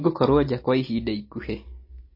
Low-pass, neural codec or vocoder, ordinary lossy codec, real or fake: 5.4 kHz; none; MP3, 24 kbps; real